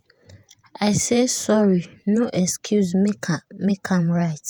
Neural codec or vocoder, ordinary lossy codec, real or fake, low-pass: vocoder, 48 kHz, 128 mel bands, Vocos; none; fake; none